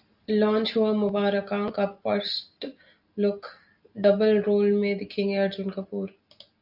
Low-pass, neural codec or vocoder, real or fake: 5.4 kHz; none; real